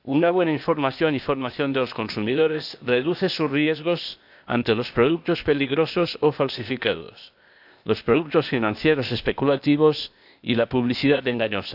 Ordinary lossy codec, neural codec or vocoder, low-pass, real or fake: none; codec, 16 kHz, 0.8 kbps, ZipCodec; 5.4 kHz; fake